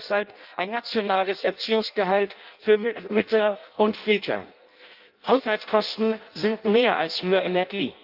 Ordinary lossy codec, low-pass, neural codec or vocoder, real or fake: Opus, 24 kbps; 5.4 kHz; codec, 16 kHz in and 24 kHz out, 0.6 kbps, FireRedTTS-2 codec; fake